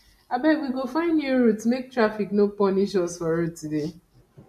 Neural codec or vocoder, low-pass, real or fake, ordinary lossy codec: none; 14.4 kHz; real; MP3, 64 kbps